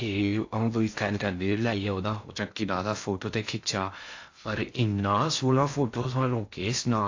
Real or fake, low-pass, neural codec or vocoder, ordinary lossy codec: fake; 7.2 kHz; codec, 16 kHz in and 24 kHz out, 0.6 kbps, FocalCodec, streaming, 4096 codes; AAC, 48 kbps